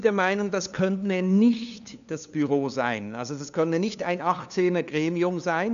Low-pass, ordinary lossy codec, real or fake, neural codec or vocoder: 7.2 kHz; none; fake; codec, 16 kHz, 2 kbps, FunCodec, trained on LibriTTS, 25 frames a second